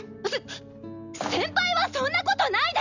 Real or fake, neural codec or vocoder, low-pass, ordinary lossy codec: real; none; 7.2 kHz; none